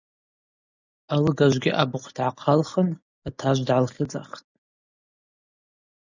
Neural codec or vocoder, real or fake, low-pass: none; real; 7.2 kHz